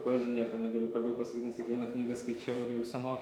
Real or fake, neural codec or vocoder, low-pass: fake; autoencoder, 48 kHz, 32 numbers a frame, DAC-VAE, trained on Japanese speech; 19.8 kHz